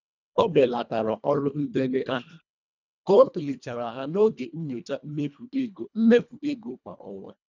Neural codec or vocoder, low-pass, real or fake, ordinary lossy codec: codec, 24 kHz, 1.5 kbps, HILCodec; 7.2 kHz; fake; none